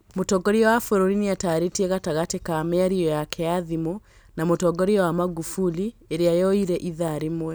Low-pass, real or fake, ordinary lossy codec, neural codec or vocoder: none; real; none; none